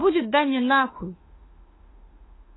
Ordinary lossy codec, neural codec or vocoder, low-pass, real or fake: AAC, 16 kbps; autoencoder, 48 kHz, 32 numbers a frame, DAC-VAE, trained on Japanese speech; 7.2 kHz; fake